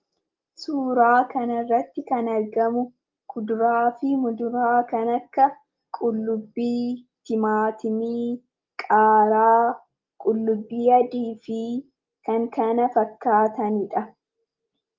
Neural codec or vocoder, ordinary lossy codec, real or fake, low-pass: none; Opus, 32 kbps; real; 7.2 kHz